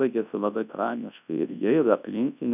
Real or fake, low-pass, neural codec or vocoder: fake; 3.6 kHz; codec, 24 kHz, 0.9 kbps, WavTokenizer, large speech release